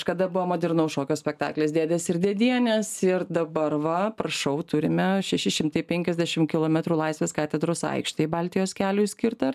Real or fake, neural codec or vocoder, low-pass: real; none; 14.4 kHz